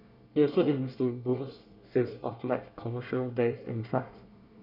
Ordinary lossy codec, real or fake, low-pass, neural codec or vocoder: none; fake; 5.4 kHz; codec, 24 kHz, 1 kbps, SNAC